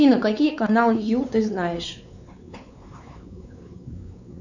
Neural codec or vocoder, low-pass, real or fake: codec, 16 kHz, 4 kbps, X-Codec, WavLM features, trained on Multilingual LibriSpeech; 7.2 kHz; fake